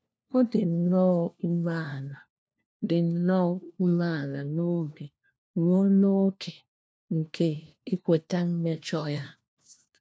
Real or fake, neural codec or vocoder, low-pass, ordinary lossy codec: fake; codec, 16 kHz, 1 kbps, FunCodec, trained on LibriTTS, 50 frames a second; none; none